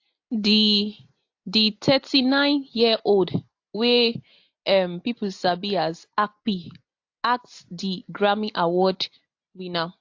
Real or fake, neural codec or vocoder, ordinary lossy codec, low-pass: real; none; AAC, 48 kbps; 7.2 kHz